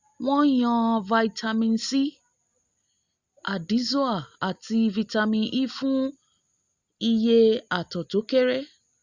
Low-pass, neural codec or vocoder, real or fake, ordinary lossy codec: 7.2 kHz; none; real; Opus, 64 kbps